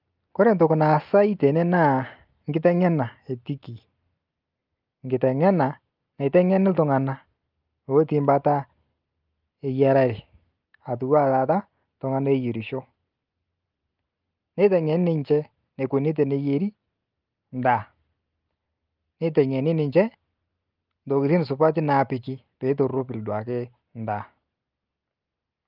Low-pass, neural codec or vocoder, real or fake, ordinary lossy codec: 5.4 kHz; none; real; Opus, 24 kbps